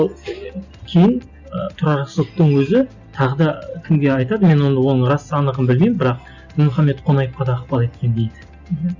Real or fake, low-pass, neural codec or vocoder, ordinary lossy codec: real; 7.2 kHz; none; none